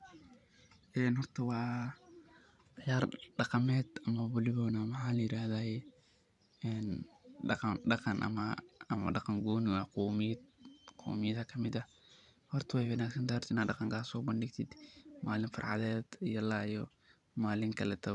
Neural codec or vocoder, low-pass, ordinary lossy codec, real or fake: vocoder, 44.1 kHz, 128 mel bands every 512 samples, BigVGAN v2; 10.8 kHz; none; fake